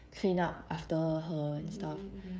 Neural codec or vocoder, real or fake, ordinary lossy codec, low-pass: codec, 16 kHz, 16 kbps, FreqCodec, smaller model; fake; none; none